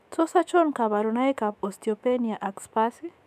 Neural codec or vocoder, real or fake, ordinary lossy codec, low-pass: autoencoder, 48 kHz, 128 numbers a frame, DAC-VAE, trained on Japanese speech; fake; none; 14.4 kHz